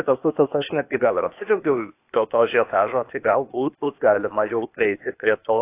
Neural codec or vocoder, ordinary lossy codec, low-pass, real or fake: codec, 16 kHz, 0.8 kbps, ZipCodec; AAC, 24 kbps; 3.6 kHz; fake